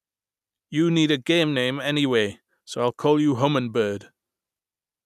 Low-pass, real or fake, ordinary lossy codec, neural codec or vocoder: 14.4 kHz; real; none; none